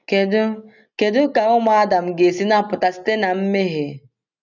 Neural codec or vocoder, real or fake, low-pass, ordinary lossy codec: none; real; 7.2 kHz; none